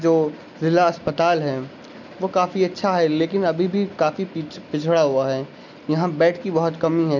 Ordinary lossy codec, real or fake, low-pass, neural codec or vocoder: none; real; 7.2 kHz; none